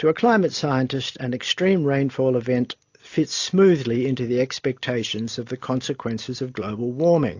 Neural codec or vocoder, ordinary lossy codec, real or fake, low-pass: none; AAC, 48 kbps; real; 7.2 kHz